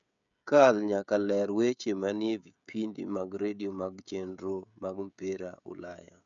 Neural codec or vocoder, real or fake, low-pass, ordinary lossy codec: codec, 16 kHz, 16 kbps, FreqCodec, smaller model; fake; 7.2 kHz; none